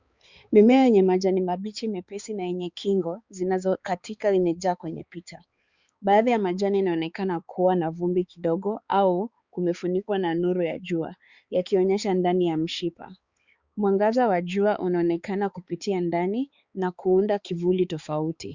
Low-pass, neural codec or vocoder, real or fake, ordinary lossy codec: 7.2 kHz; codec, 16 kHz, 4 kbps, X-Codec, HuBERT features, trained on balanced general audio; fake; Opus, 64 kbps